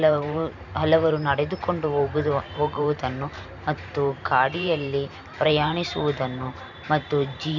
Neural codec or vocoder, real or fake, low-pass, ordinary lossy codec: vocoder, 44.1 kHz, 128 mel bands every 512 samples, BigVGAN v2; fake; 7.2 kHz; none